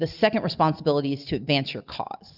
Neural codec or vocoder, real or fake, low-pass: none; real; 5.4 kHz